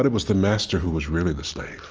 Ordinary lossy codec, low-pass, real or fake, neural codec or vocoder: Opus, 24 kbps; 7.2 kHz; real; none